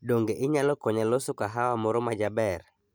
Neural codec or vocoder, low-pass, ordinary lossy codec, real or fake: none; none; none; real